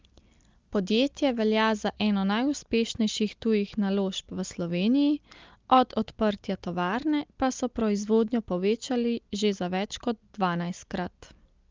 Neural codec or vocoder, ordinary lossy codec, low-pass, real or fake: none; Opus, 32 kbps; 7.2 kHz; real